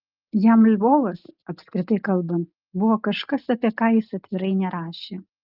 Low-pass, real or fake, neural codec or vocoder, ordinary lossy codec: 5.4 kHz; real; none; Opus, 24 kbps